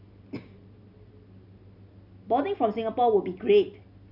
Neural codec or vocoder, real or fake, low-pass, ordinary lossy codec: none; real; 5.4 kHz; none